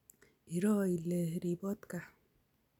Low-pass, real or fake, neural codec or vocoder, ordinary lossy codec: 19.8 kHz; real; none; none